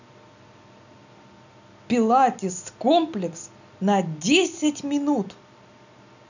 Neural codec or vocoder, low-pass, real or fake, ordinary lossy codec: none; 7.2 kHz; real; none